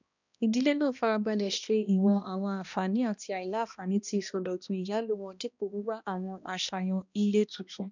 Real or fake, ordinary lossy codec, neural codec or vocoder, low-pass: fake; none; codec, 16 kHz, 1 kbps, X-Codec, HuBERT features, trained on balanced general audio; 7.2 kHz